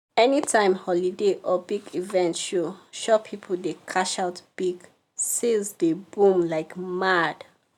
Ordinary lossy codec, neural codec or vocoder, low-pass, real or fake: none; none; 19.8 kHz; real